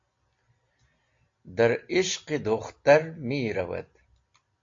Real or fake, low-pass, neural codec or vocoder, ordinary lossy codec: real; 7.2 kHz; none; AAC, 48 kbps